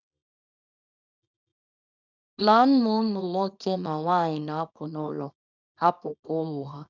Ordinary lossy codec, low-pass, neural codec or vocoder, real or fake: none; 7.2 kHz; codec, 24 kHz, 0.9 kbps, WavTokenizer, small release; fake